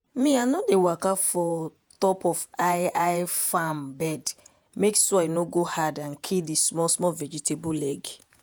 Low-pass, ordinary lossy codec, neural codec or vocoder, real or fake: none; none; vocoder, 48 kHz, 128 mel bands, Vocos; fake